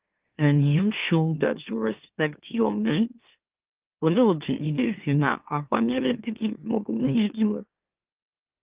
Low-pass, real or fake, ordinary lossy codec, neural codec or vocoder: 3.6 kHz; fake; Opus, 32 kbps; autoencoder, 44.1 kHz, a latent of 192 numbers a frame, MeloTTS